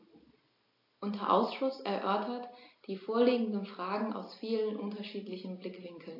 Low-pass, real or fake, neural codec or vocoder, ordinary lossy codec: 5.4 kHz; real; none; AAC, 32 kbps